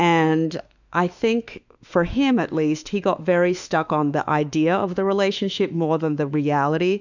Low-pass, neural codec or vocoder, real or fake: 7.2 kHz; autoencoder, 48 kHz, 32 numbers a frame, DAC-VAE, trained on Japanese speech; fake